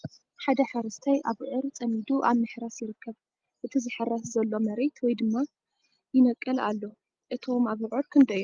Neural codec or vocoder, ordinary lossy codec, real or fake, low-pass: none; Opus, 32 kbps; real; 7.2 kHz